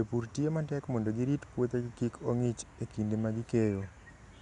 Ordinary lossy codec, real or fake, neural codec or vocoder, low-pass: none; real; none; 10.8 kHz